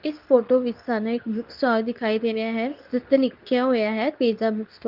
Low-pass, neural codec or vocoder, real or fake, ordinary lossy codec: 5.4 kHz; codec, 24 kHz, 0.9 kbps, WavTokenizer, medium speech release version 2; fake; Opus, 24 kbps